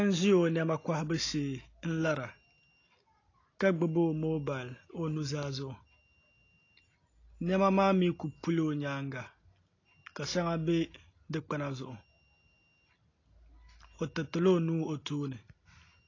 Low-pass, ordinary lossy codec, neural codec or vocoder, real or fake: 7.2 kHz; AAC, 32 kbps; none; real